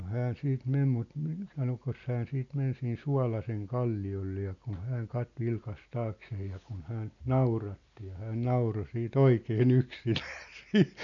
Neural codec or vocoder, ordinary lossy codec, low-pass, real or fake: none; none; 7.2 kHz; real